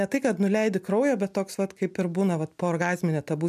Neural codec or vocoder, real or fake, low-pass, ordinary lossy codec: none; real; 14.4 kHz; MP3, 96 kbps